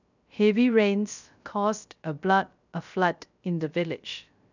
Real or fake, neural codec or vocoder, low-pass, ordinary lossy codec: fake; codec, 16 kHz, 0.3 kbps, FocalCodec; 7.2 kHz; none